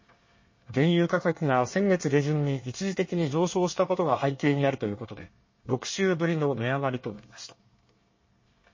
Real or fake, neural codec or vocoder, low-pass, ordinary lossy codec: fake; codec, 24 kHz, 1 kbps, SNAC; 7.2 kHz; MP3, 32 kbps